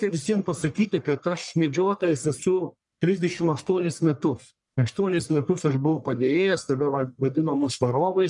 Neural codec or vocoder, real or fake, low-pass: codec, 44.1 kHz, 1.7 kbps, Pupu-Codec; fake; 10.8 kHz